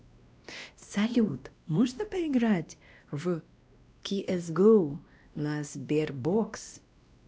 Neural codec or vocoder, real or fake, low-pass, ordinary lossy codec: codec, 16 kHz, 1 kbps, X-Codec, WavLM features, trained on Multilingual LibriSpeech; fake; none; none